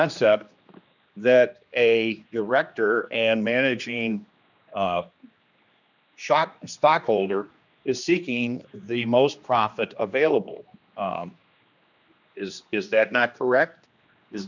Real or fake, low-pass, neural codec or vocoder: fake; 7.2 kHz; codec, 16 kHz, 2 kbps, X-Codec, HuBERT features, trained on general audio